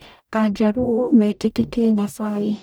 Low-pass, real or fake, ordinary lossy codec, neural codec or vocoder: none; fake; none; codec, 44.1 kHz, 0.9 kbps, DAC